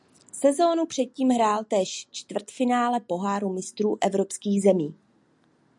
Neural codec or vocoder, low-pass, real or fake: none; 10.8 kHz; real